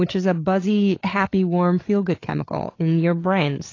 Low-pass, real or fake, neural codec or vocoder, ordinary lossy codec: 7.2 kHz; fake; codec, 16 kHz, 8 kbps, FreqCodec, larger model; AAC, 32 kbps